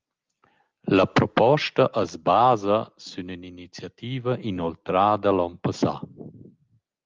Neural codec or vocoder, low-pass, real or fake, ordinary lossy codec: none; 7.2 kHz; real; Opus, 24 kbps